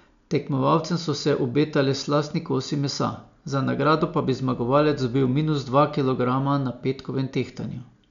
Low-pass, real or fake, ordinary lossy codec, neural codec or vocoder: 7.2 kHz; real; none; none